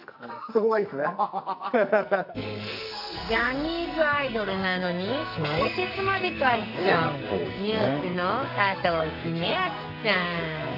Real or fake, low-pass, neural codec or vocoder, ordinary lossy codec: fake; 5.4 kHz; codec, 44.1 kHz, 2.6 kbps, SNAC; none